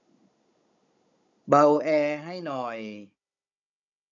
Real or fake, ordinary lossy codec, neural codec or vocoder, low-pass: fake; none; codec, 16 kHz, 16 kbps, FunCodec, trained on Chinese and English, 50 frames a second; 7.2 kHz